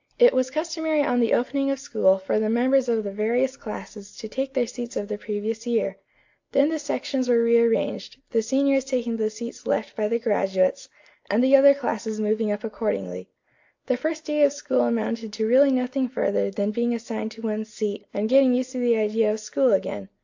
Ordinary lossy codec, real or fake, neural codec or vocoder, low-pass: AAC, 48 kbps; real; none; 7.2 kHz